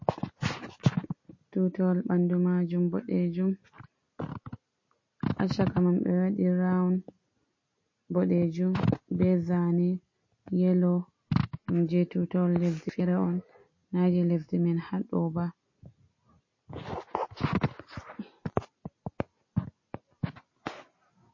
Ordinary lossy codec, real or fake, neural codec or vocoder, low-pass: MP3, 32 kbps; real; none; 7.2 kHz